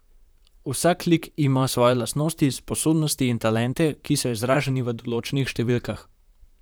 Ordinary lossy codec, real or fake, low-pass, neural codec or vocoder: none; fake; none; vocoder, 44.1 kHz, 128 mel bands, Pupu-Vocoder